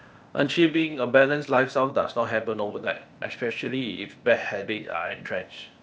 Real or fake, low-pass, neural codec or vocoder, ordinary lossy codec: fake; none; codec, 16 kHz, 0.8 kbps, ZipCodec; none